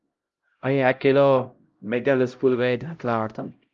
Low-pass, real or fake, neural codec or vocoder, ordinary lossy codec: 7.2 kHz; fake; codec, 16 kHz, 0.5 kbps, X-Codec, HuBERT features, trained on LibriSpeech; Opus, 32 kbps